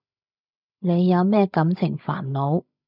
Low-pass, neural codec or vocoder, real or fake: 5.4 kHz; codec, 16 kHz, 16 kbps, FreqCodec, larger model; fake